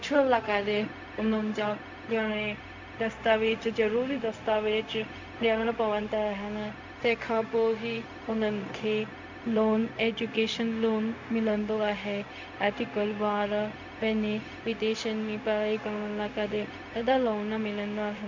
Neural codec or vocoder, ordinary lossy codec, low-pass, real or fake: codec, 16 kHz, 0.4 kbps, LongCat-Audio-Codec; MP3, 48 kbps; 7.2 kHz; fake